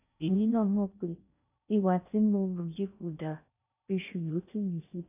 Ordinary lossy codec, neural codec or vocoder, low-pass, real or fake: none; codec, 16 kHz in and 24 kHz out, 0.6 kbps, FocalCodec, streaming, 4096 codes; 3.6 kHz; fake